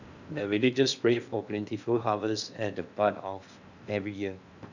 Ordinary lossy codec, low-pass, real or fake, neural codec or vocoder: none; 7.2 kHz; fake; codec, 16 kHz in and 24 kHz out, 0.8 kbps, FocalCodec, streaming, 65536 codes